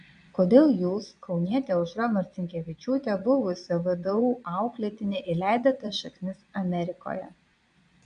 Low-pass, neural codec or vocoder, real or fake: 9.9 kHz; vocoder, 22.05 kHz, 80 mel bands, Vocos; fake